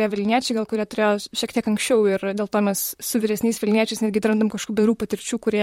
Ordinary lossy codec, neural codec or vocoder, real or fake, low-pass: MP3, 64 kbps; vocoder, 44.1 kHz, 128 mel bands, Pupu-Vocoder; fake; 19.8 kHz